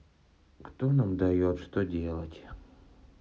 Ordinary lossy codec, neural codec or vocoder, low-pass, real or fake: none; none; none; real